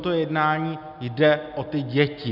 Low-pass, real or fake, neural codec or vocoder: 5.4 kHz; real; none